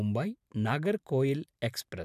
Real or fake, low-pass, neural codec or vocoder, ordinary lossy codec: fake; 14.4 kHz; vocoder, 44.1 kHz, 128 mel bands every 512 samples, BigVGAN v2; none